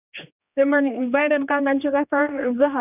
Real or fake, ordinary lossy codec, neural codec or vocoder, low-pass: fake; none; codec, 16 kHz, 2 kbps, X-Codec, HuBERT features, trained on general audio; 3.6 kHz